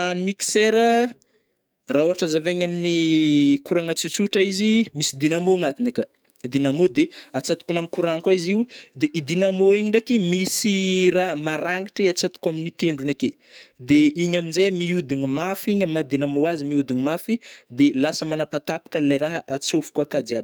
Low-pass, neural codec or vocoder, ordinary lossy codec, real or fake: none; codec, 44.1 kHz, 2.6 kbps, SNAC; none; fake